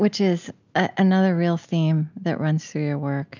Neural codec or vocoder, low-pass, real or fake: none; 7.2 kHz; real